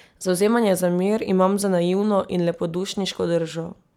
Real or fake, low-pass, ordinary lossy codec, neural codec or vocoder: real; 19.8 kHz; none; none